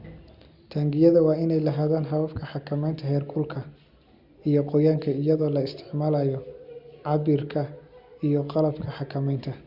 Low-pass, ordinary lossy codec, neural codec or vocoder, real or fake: 5.4 kHz; Opus, 64 kbps; none; real